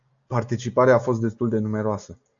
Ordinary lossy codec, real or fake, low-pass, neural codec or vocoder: AAC, 64 kbps; real; 7.2 kHz; none